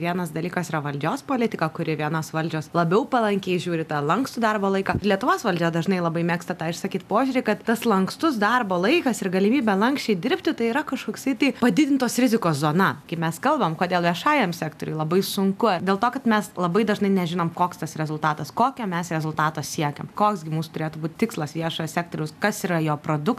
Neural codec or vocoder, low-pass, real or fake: none; 14.4 kHz; real